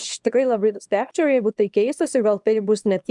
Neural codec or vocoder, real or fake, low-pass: codec, 24 kHz, 0.9 kbps, WavTokenizer, small release; fake; 10.8 kHz